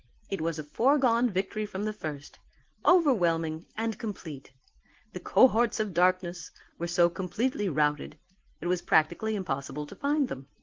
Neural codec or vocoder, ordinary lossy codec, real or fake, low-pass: none; Opus, 16 kbps; real; 7.2 kHz